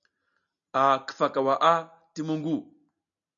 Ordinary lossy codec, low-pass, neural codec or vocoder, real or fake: MP3, 96 kbps; 7.2 kHz; none; real